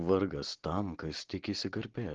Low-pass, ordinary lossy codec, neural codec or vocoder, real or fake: 7.2 kHz; Opus, 16 kbps; none; real